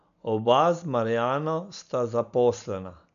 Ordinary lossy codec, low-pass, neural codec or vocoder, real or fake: none; 7.2 kHz; none; real